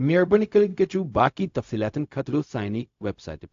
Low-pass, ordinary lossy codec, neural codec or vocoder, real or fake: 7.2 kHz; AAC, 48 kbps; codec, 16 kHz, 0.4 kbps, LongCat-Audio-Codec; fake